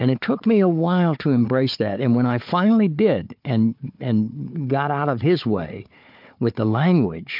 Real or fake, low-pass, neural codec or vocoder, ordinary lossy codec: fake; 5.4 kHz; codec, 16 kHz, 4 kbps, FunCodec, trained on Chinese and English, 50 frames a second; MP3, 48 kbps